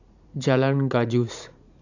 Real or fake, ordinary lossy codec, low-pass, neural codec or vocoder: fake; none; 7.2 kHz; vocoder, 44.1 kHz, 80 mel bands, Vocos